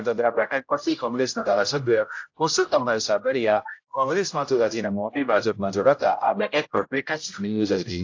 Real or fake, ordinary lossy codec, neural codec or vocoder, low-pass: fake; AAC, 48 kbps; codec, 16 kHz, 0.5 kbps, X-Codec, HuBERT features, trained on general audio; 7.2 kHz